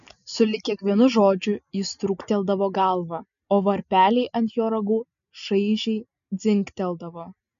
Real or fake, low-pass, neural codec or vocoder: real; 7.2 kHz; none